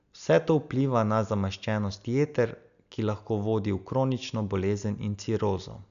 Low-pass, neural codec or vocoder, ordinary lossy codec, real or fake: 7.2 kHz; none; none; real